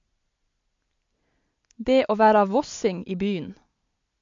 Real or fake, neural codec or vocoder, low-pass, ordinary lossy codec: real; none; 7.2 kHz; MP3, 48 kbps